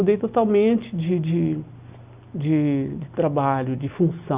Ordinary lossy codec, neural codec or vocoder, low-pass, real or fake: Opus, 64 kbps; none; 3.6 kHz; real